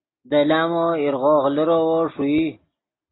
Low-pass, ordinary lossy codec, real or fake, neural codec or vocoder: 7.2 kHz; AAC, 16 kbps; real; none